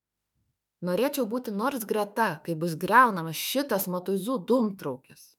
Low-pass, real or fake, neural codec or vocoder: 19.8 kHz; fake; autoencoder, 48 kHz, 32 numbers a frame, DAC-VAE, trained on Japanese speech